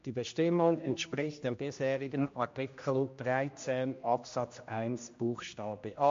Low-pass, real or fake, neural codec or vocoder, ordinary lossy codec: 7.2 kHz; fake; codec, 16 kHz, 1 kbps, X-Codec, HuBERT features, trained on general audio; MP3, 48 kbps